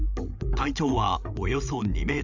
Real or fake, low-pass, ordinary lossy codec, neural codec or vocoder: fake; 7.2 kHz; none; codec, 16 kHz, 8 kbps, FreqCodec, larger model